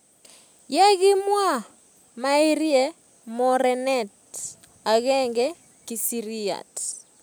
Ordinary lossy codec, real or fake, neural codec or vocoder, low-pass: none; real; none; none